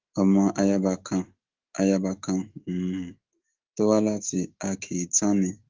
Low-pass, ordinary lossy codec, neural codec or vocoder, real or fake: 7.2 kHz; Opus, 16 kbps; autoencoder, 48 kHz, 128 numbers a frame, DAC-VAE, trained on Japanese speech; fake